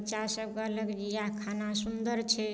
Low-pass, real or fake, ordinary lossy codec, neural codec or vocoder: none; real; none; none